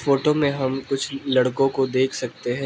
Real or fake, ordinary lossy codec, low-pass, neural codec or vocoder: real; none; none; none